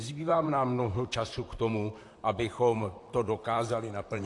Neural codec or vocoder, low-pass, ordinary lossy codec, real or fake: vocoder, 44.1 kHz, 128 mel bands, Pupu-Vocoder; 10.8 kHz; AAC, 48 kbps; fake